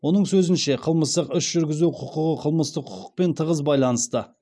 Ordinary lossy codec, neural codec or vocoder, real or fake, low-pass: none; none; real; none